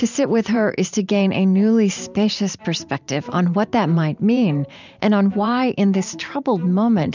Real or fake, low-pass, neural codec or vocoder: fake; 7.2 kHz; vocoder, 44.1 kHz, 128 mel bands every 512 samples, BigVGAN v2